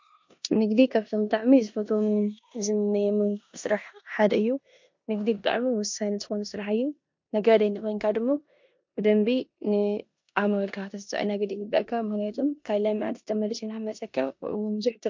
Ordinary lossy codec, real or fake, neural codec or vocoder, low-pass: MP3, 48 kbps; fake; codec, 16 kHz in and 24 kHz out, 0.9 kbps, LongCat-Audio-Codec, four codebook decoder; 7.2 kHz